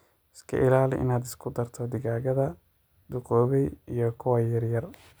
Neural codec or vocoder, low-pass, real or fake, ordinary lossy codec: none; none; real; none